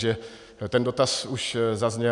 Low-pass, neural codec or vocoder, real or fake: 10.8 kHz; none; real